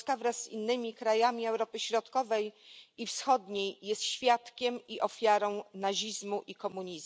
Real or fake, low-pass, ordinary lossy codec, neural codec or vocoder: real; none; none; none